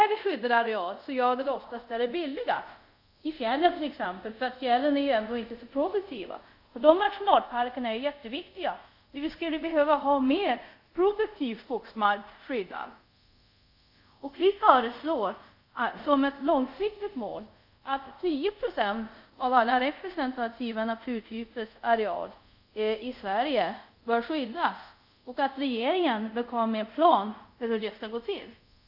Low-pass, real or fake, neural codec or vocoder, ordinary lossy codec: 5.4 kHz; fake; codec, 24 kHz, 0.5 kbps, DualCodec; AAC, 48 kbps